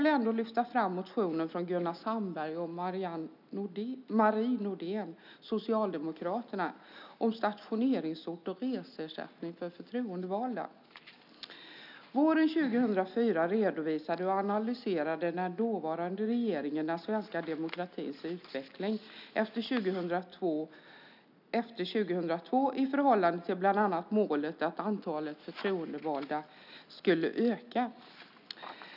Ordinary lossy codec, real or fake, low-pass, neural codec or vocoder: none; real; 5.4 kHz; none